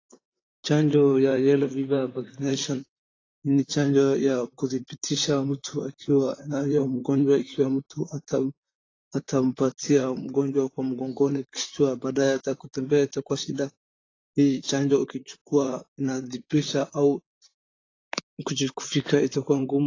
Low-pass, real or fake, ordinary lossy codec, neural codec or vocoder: 7.2 kHz; fake; AAC, 32 kbps; vocoder, 44.1 kHz, 128 mel bands, Pupu-Vocoder